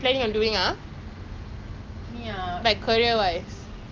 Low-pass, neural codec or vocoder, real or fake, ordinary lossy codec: 7.2 kHz; none; real; Opus, 24 kbps